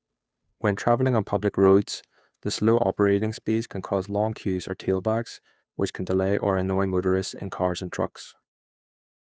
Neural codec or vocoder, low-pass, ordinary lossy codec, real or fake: codec, 16 kHz, 2 kbps, FunCodec, trained on Chinese and English, 25 frames a second; none; none; fake